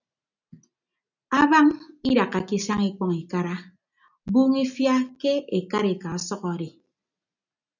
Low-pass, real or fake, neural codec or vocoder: 7.2 kHz; real; none